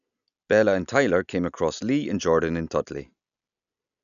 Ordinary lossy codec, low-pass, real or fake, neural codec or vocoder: none; 7.2 kHz; real; none